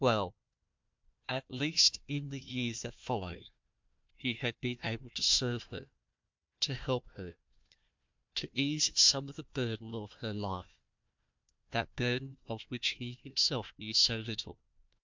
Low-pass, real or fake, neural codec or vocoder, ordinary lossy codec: 7.2 kHz; fake; codec, 16 kHz, 1 kbps, FunCodec, trained on Chinese and English, 50 frames a second; MP3, 64 kbps